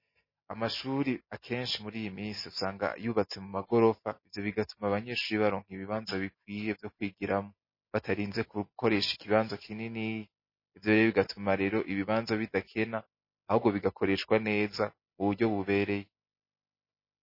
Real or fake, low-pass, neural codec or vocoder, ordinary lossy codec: real; 5.4 kHz; none; MP3, 24 kbps